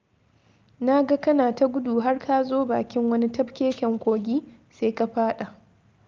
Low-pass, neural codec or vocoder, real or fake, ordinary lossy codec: 7.2 kHz; none; real; Opus, 24 kbps